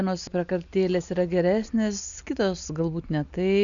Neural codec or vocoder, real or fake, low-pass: none; real; 7.2 kHz